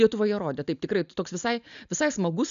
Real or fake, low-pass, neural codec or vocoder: real; 7.2 kHz; none